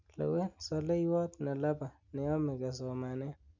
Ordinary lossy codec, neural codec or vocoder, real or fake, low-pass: MP3, 64 kbps; none; real; 7.2 kHz